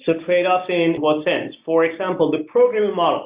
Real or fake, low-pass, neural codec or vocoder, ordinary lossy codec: real; 3.6 kHz; none; Opus, 64 kbps